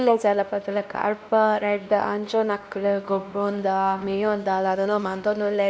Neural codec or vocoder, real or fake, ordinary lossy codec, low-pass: codec, 16 kHz, 1 kbps, X-Codec, WavLM features, trained on Multilingual LibriSpeech; fake; none; none